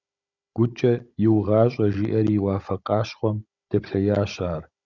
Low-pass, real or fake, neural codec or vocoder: 7.2 kHz; fake; codec, 16 kHz, 16 kbps, FunCodec, trained on Chinese and English, 50 frames a second